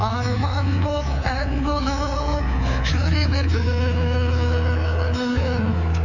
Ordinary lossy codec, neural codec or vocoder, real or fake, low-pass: none; codec, 24 kHz, 3.1 kbps, DualCodec; fake; 7.2 kHz